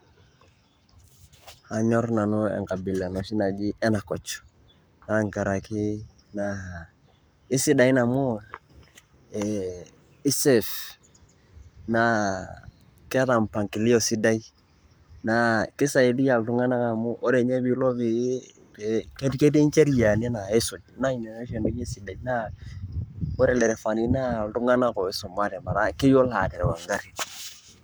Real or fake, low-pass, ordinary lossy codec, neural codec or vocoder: fake; none; none; codec, 44.1 kHz, 7.8 kbps, Pupu-Codec